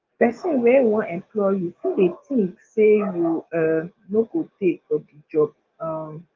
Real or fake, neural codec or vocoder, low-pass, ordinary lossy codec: fake; codec, 44.1 kHz, 7.8 kbps, DAC; 7.2 kHz; Opus, 24 kbps